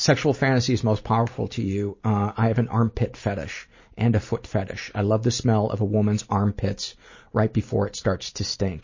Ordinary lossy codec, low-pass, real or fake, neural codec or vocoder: MP3, 32 kbps; 7.2 kHz; real; none